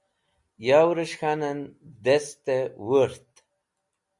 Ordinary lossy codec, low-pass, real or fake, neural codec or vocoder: Opus, 64 kbps; 10.8 kHz; real; none